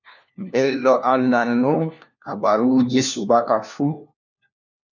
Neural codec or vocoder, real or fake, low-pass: codec, 16 kHz, 1 kbps, FunCodec, trained on LibriTTS, 50 frames a second; fake; 7.2 kHz